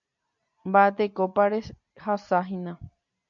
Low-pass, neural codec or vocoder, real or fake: 7.2 kHz; none; real